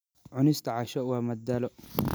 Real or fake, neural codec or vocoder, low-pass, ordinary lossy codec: real; none; none; none